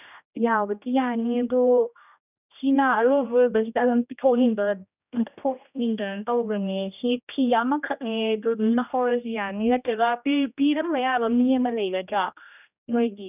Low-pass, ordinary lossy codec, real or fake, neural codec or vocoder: 3.6 kHz; none; fake; codec, 16 kHz, 1 kbps, X-Codec, HuBERT features, trained on general audio